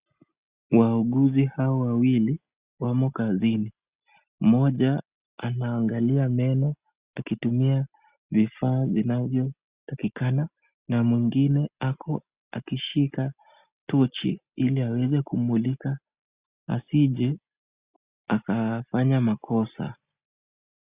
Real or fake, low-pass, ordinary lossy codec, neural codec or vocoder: real; 3.6 kHz; Opus, 64 kbps; none